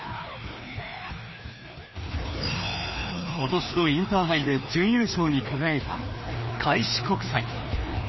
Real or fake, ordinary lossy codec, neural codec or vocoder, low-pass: fake; MP3, 24 kbps; codec, 16 kHz, 2 kbps, FreqCodec, larger model; 7.2 kHz